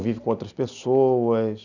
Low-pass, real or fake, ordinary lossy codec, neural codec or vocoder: 7.2 kHz; real; none; none